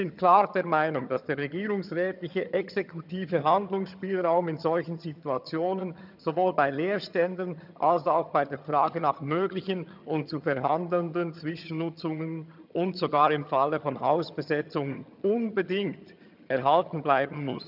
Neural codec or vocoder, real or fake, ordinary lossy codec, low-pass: vocoder, 22.05 kHz, 80 mel bands, HiFi-GAN; fake; none; 5.4 kHz